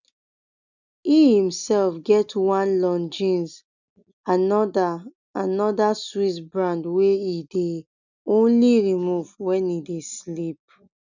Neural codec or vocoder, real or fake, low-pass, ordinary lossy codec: none; real; 7.2 kHz; none